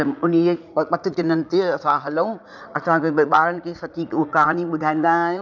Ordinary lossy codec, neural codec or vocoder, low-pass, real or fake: none; vocoder, 44.1 kHz, 80 mel bands, Vocos; 7.2 kHz; fake